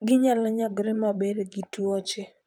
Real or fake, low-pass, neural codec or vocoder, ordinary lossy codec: fake; 19.8 kHz; vocoder, 44.1 kHz, 128 mel bands, Pupu-Vocoder; none